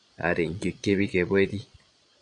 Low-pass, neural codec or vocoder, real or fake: 9.9 kHz; vocoder, 22.05 kHz, 80 mel bands, Vocos; fake